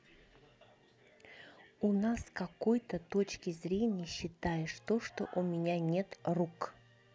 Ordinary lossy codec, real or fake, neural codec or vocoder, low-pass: none; real; none; none